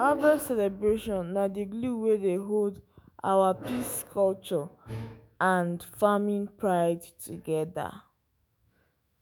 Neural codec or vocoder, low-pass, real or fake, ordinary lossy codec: autoencoder, 48 kHz, 128 numbers a frame, DAC-VAE, trained on Japanese speech; none; fake; none